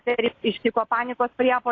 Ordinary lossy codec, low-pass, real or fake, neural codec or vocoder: AAC, 32 kbps; 7.2 kHz; real; none